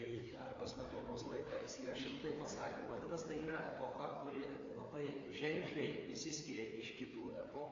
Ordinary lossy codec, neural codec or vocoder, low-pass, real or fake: AAC, 32 kbps; codec, 16 kHz, 4 kbps, FreqCodec, larger model; 7.2 kHz; fake